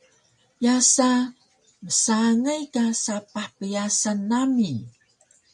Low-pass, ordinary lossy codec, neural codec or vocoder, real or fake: 10.8 kHz; MP3, 64 kbps; none; real